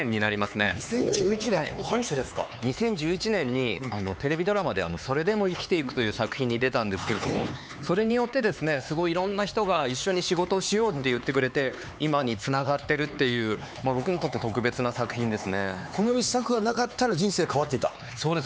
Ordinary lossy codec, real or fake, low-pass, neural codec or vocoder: none; fake; none; codec, 16 kHz, 4 kbps, X-Codec, HuBERT features, trained on LibriSpeech